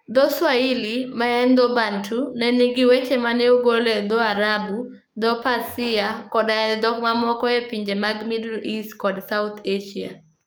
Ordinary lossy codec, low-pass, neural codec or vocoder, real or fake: none; none; codec, 44.1 kHz, 7.8 kbps, DAC; fake